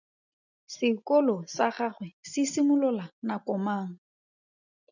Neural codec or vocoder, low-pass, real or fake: none; 7.2 kHz; real